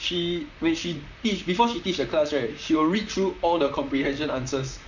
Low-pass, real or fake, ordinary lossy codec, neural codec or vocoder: 7.2 kHz; fake; none; vocoder, 44.1 kHz, 128 mel bands, Pupu-Vocoder